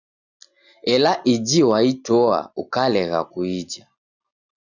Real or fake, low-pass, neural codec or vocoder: real; 7.2 kHz; none